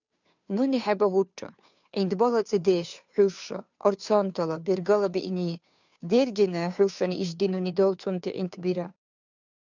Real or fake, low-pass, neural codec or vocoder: fake; 7.2 kHz; codec, 16 kHz, 2 kbps, FunCodec, trained on Chinese and English, 25 frames a second